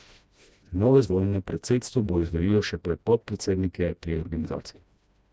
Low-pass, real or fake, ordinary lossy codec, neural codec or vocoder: none; fake; none; codec, 16 kHz, 1 kbps, FreqCodec, smaller model